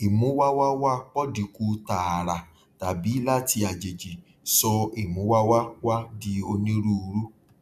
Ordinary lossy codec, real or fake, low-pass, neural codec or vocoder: none; real; 14.4 kHz; none